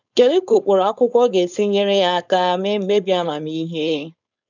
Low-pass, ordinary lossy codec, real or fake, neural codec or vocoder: 7.2 kHz; none; fake; codec, 16 kHz, 4.8 kbps, FACodec